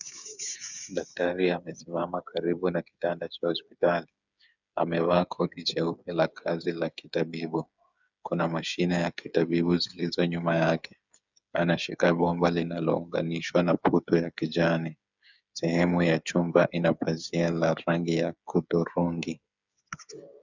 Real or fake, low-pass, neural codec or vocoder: fake; 7.2 kHz; codec, 16 kHz, 8 kbps, FreqCodec, smaller model